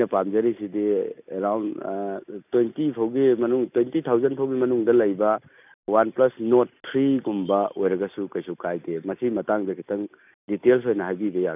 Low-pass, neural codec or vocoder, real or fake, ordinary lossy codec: 3.6 kHz; none; real; none